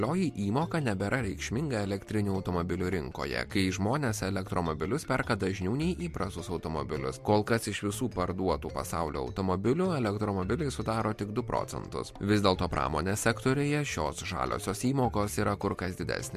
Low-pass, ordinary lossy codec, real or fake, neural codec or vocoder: 14.4 kHz; MP3, 64 kbps; real; none